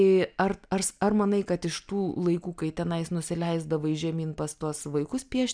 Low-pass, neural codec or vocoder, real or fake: 9.9 kHz; none; real